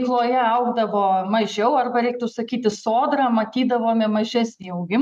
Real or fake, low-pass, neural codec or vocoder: real; 14.4 kHz; none